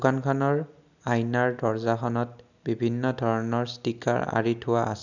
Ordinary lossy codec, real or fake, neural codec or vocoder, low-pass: none; real; none; 7.2 kHz